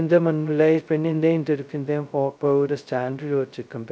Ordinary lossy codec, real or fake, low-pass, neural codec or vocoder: none; fake; none; codec, 16 kHz, 0.2 kbps, FocalCodec